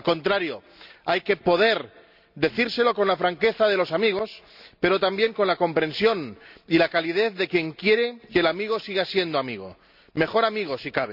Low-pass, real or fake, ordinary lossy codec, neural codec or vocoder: 5.4 kHz; real; none; none